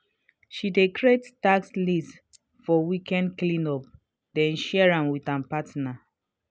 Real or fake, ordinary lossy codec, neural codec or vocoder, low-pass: real; none; none; none